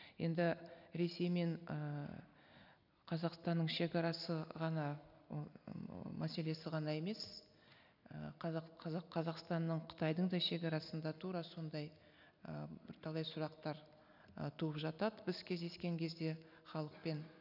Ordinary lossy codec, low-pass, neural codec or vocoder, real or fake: none; 5.4 kHz; none; real